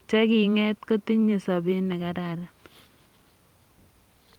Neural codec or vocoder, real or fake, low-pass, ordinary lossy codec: vocoder, 44.1 kHz, 128 mel bands every 512 samples, BigVGAN v2; fake; 19.8 kHz; Opus, 32 kbps